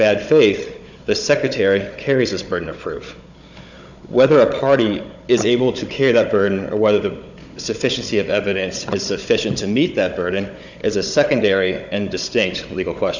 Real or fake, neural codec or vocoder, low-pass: fake; codec, 16 kHz, 16 kbps, FunCodec, trained on Chinese and English, 50 frames a second; 7.2 kHz